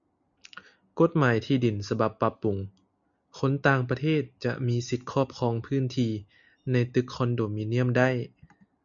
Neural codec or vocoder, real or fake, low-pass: none; real; 7.2 kHz